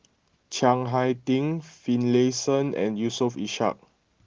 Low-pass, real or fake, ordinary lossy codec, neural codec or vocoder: 7.2 kHz; real; Opus, 16 kbps; none